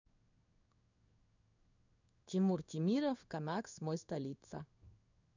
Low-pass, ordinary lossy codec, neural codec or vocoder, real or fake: 7.2 kHz; none; codec, 16 kHz in and 24 kHz out, 1 kbps, XY-Tokenizer; fake